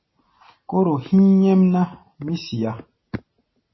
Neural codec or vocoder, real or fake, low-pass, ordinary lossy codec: none; real; 7.2 kHz; MP3, 24 kbps